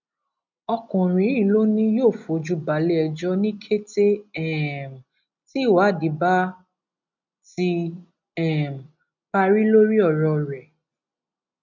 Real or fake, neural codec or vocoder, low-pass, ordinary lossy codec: real; none; 7.2 kHz; none